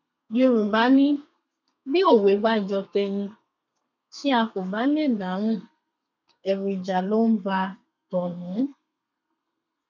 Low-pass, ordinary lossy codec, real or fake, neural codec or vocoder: 7.2 kHz; none; fake; codec, 32 kHz, 1.9 kbps, SNAC